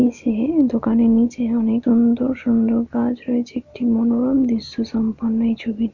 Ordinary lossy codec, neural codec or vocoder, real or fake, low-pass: Opus, 64 kbps; none; real; 7.2 kHz